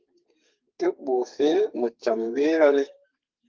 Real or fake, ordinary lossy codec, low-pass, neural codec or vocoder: fake; Opus, 32 kbps; 7.2 kHz; codec, 32 kHz, 1.9 kbps, SNAC